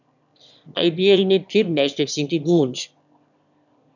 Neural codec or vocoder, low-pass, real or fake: autoencoder, 22.05 kHz, a latent of 192 numbers a frame, VITS, trained on one speaker; 7.2 kHz; fake